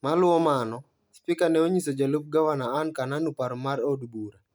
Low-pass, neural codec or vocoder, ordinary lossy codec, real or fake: none; none; none; real